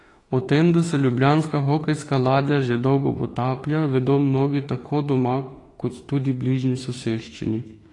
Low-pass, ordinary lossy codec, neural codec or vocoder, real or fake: 10.8 kHz; AAC, 32 kbps; autoencoder, 48 kHz, 32 numbers a frame, DAC-VAE, trained on Japanese speech; fake